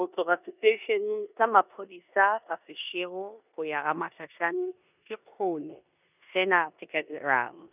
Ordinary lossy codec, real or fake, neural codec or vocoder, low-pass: none; fake; codec, 16 kHz in and 24 kHz out, 0.9 kbps, LongCat-Audio-Codec, four codebook decoder; 3.6 kHz